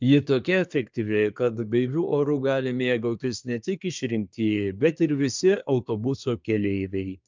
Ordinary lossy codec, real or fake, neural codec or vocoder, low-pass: MP3, 64 kbps; fake; codec, 24 kHz, 1 kbps, SNAC; 7.2 kHz